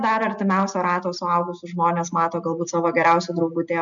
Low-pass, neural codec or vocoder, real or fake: 7.2 kHz; none; real